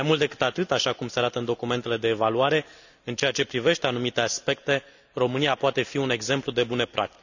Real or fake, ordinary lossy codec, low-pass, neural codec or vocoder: real; none; 7.2 kHz; none